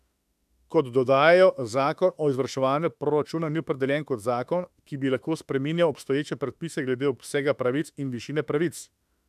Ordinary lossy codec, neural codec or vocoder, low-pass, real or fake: none; autoencoder, 48 kHz, 32 numbers a frame, DAC-VAE, trained on Japanese speech; 14.4 kHz; fake